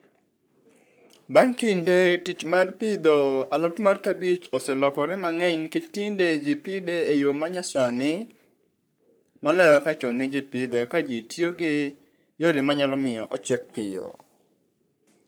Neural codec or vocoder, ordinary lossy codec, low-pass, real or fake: codec, 44.1 kHz, 3.4 kbps, Pupu-Codec; none; none; fake